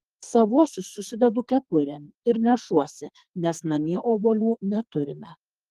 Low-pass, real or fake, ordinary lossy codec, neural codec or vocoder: 14.4 kHz; fake; Opus, 24 kbps; codec, 44.1 kHz, 2.6 kbps, SNAC